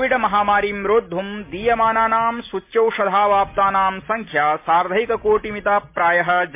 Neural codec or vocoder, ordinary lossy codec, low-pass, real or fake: none; MP3, 24 kbps; 3.6 kHz; real